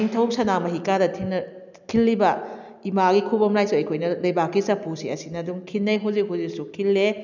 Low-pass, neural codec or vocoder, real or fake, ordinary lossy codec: 7.2 kHz; none; real; none